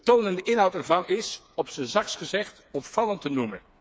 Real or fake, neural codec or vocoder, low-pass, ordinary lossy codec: fake; codec, 16 kHz, 4 kbps, FreqCodec, smaller model; none; none